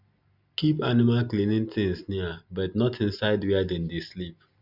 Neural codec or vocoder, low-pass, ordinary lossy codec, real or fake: none; 5.4 kHz; none; real